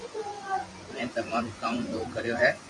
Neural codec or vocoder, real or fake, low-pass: vocoder, 24 kHz, 100 mel bands, Vocos; fake; 10.8 kHz